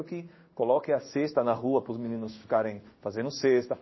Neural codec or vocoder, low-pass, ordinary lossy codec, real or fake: codec, 16 kHz in and 24 kHz out, 1 kbps, XY-Tokenizer; 7.2 kHz; MP3, 24 kbps; fake